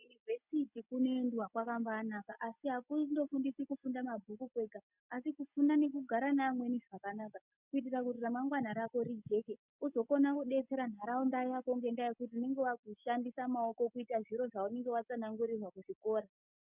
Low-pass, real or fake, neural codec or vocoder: 3.6 kHz; real; none